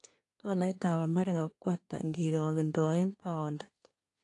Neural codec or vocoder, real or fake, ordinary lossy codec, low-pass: codec, 24 kHz, 1 kbps, SNAC; fake; AAC, 48 kbps; 10.8 kHz